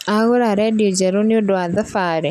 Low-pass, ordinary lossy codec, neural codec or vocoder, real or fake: 14.4 kHz; none; none; real